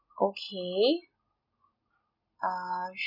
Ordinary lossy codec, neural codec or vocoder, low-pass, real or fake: none; none; 5.4 kHz; real